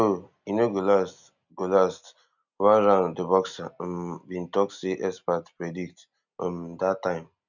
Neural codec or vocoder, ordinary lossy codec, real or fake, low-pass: none; none; real; 7.2 kHz